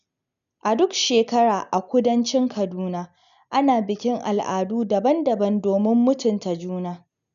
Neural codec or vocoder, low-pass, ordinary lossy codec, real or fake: none; 7.2 kHz; none; real